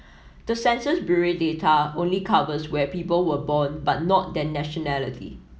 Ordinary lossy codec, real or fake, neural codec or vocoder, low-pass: none; real; none; none